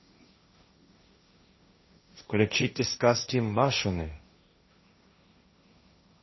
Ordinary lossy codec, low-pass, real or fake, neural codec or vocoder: MP3, 24 kbps; 7.2 kHz; fake; codec, 16 kHz, 1.1 kbps, Voila-Tokenizer